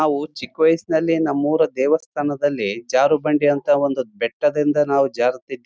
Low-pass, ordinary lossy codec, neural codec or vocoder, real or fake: none; none; none; real